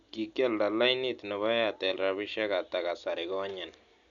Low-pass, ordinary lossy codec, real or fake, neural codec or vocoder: 7.2 kHz; none; real; none